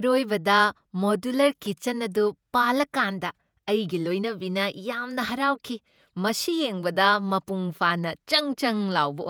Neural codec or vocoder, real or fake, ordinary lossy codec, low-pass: vocoder, 48 kHz, 128 mel bands, Vocos; fake; none; none